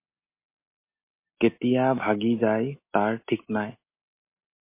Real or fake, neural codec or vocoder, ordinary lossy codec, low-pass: real; none; MP3, 24 kbps; 3.6 kHz